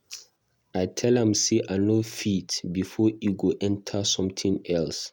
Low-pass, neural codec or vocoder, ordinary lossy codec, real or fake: 19.8 kHz; none; none; real